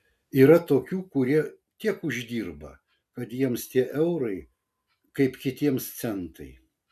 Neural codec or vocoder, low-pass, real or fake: none; 14.4 kHz; real